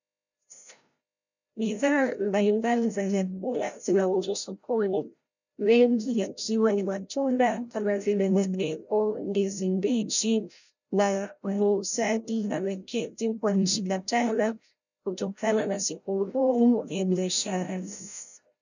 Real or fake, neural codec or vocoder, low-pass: fake; codec, 16 kHz, 0.5 kbps, FreqCodec, larger model; 7.2 kHz